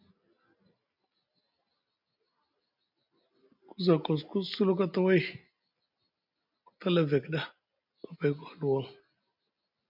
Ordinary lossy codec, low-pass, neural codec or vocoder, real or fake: MP3, 48 kbps; 5.4 kHz; none; real